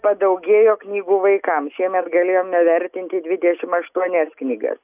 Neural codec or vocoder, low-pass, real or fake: none; 3.6 kHz; real